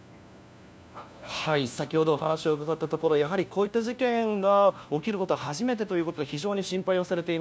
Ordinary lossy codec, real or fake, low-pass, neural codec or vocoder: none; fake; none; codec, 16 kHz, 1 kbps, FunCodec, trained on LibriTTS, 50 frames a second